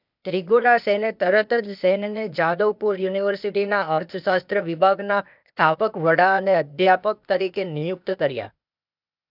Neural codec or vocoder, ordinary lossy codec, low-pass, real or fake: codec, 16 kHz, 0.8 kbps, ZipCodec; none; 5.4 kHz; fake